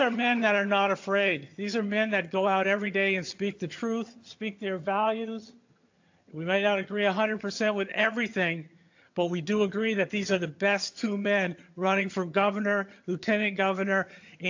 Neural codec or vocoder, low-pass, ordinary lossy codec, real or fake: vocoder, 22.05 kHz, 80 mel bands, HiFi-GAN; 7.2 kHz; AAC, 48 kbps; fake